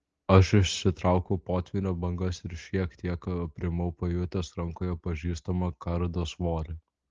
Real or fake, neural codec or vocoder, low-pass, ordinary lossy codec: real; none; 7.2 kHz; Opus, 16 kbps